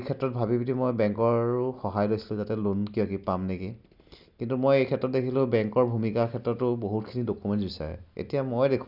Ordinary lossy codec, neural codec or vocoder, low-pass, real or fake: none; none; 5.4 kHz; real